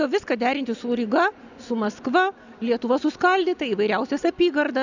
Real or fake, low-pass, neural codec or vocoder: real; 7.2 kHz; none